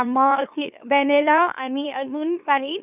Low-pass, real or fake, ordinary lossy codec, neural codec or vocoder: 3.6 kHz; fake; none; autoencoder, 44.1 kHz, a latent of 192 numbers a frame, MeloTTS